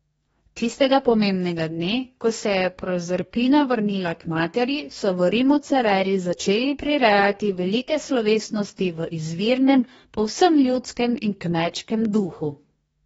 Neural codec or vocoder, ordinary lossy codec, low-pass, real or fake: codec, 44.1 kHz, 2.6 kbps, DAC; AAC, 24 kbps; 19.8 kHz; fake